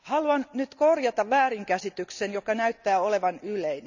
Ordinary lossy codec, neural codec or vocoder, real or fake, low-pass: none; none; real; 7.2 kHz